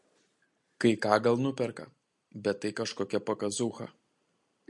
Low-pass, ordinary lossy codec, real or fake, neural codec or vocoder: 10.8 kHz; MP3, 48 kbps; real; none